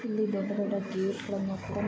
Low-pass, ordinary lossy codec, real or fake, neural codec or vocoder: none; none; real; none